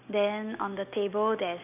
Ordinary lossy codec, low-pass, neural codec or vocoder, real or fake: none; 3.6 kHz; none; real